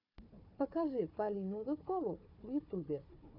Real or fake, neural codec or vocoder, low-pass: fake; codec, 16 kHz, 4 kbps, FunCodec, trained on Chinese and English, 50 frames a second; 5.4 kHz